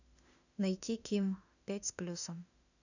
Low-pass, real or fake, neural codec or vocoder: 7.2 kHz; fake; autoencoder, 48 kHz, 32 numbers a frame, DAC-VAE, trained on Japanese speech